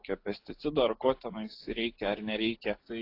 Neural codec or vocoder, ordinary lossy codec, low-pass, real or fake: none; AAC, 32 kbps; 5.4 kHz; real